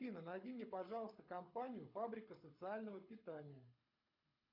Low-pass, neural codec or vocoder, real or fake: 5.4 kHz; codec, 24 kHz, 6 kbps, HILCodec; fake